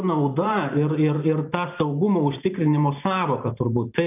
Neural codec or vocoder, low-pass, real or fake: vocoder, 44.1 kHz, 128 mel bands every 512 samples, BigVGAN v2; 3.6 kHz; fake